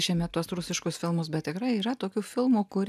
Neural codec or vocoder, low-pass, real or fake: none; 14.4 kHz; real